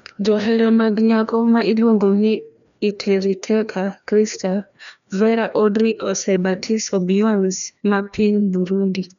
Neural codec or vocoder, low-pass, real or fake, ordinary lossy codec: codec, 16 kHz, 1 kbps, FreqCodec, larger model; 7.2 kHz; fake; none